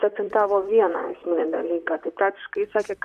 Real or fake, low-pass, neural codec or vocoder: fake; 14.4 kHz; vocoder, 44.1 kHz, 128 mel bands, Pupu-Vocoder